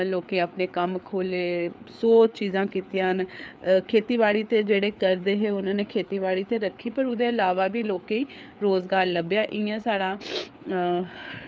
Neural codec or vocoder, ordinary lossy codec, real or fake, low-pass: codec, 16 kHz, 4 kbps, FreqCodec, larger model; none; fake; none